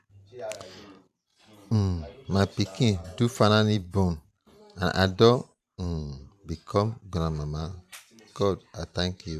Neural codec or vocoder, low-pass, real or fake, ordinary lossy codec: vocoder, 44.1 kHz, 128 mel bands every 512 samples, BigVGAN v2; 14.4 kHz; fake; none